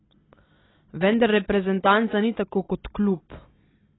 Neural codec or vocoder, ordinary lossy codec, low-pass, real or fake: none; AAC, 16 kbps; 7.2 kHz; real